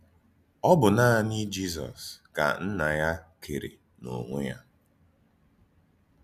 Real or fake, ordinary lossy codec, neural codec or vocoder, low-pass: real; none; none; 14.4 kHz